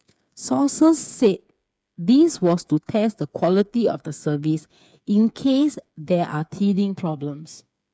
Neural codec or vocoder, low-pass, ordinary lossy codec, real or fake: codec, 16 kHz, 8 kbps, FreqCodec, smaller model; none; none; fake